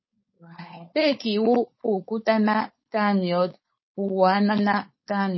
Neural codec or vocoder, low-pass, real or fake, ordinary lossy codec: codec, 16 kHz, 4.8 kbps, FACodec; 7.2 kHz; fake; MP3, 24 kbps